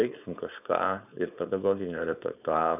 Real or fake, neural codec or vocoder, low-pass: fake; codec, 16 kHz, 4.8 kbps, FACodec; 3.6 kHz